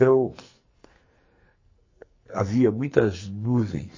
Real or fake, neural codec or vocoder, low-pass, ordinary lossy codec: fake; codec, 44.1 kHz, 2.6 kbps, SNAC; 7.2 kHz; MP3, 32 kbps